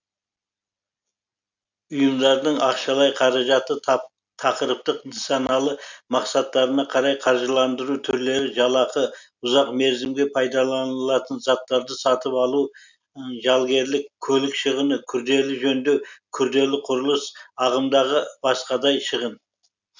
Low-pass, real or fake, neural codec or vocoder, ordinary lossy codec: 7.2 kHz; real; none; none